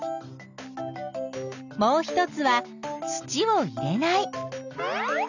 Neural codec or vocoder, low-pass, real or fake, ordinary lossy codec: none; 7.2 kHz; real; none